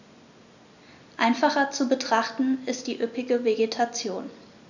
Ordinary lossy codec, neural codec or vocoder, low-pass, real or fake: none; none; 7.2 kHz; real